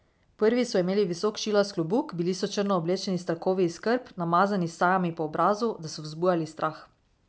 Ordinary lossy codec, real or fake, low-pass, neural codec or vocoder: none; real; none; none